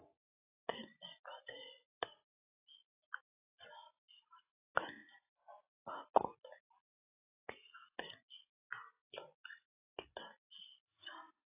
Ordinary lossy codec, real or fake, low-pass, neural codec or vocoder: AAC, 16 kbps; real; 3.6 kHz; none